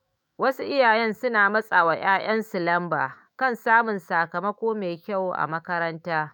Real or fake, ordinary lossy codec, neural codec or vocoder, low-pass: fake; none; autoencoder, 48 kHz, 128 numbers a frame, DAC-VAE, trained on Japanese speech; none